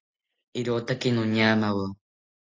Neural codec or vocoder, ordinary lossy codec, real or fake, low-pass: none; AAC, 32 kbps; real; 7.2 kHz